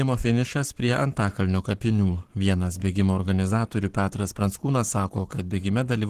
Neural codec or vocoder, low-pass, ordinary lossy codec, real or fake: codec, 44.1 kHz, 7.8 kbps, Pupu-Codec; 14.4 kHz; Opus, 16 kbps; fake